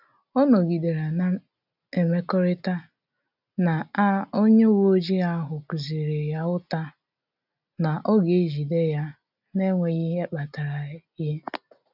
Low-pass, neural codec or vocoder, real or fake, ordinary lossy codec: 5.4 kHz; none; real; none